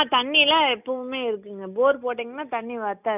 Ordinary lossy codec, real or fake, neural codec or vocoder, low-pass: none; real; none; 3.6 kHz